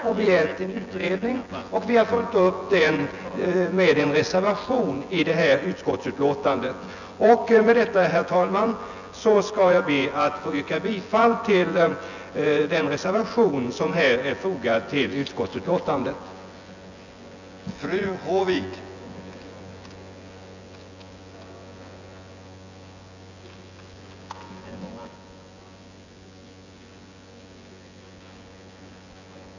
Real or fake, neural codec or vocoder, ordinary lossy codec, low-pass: fake; vocoder, 24 kHz, 100 mel bands, Vocos; none; 7.2 kHz